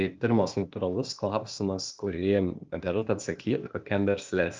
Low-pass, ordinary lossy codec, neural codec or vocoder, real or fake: 7.2 kHz; Opus, 24 kbps; codec, 16 kHz, 0.8 kbps, ZipCodec; fake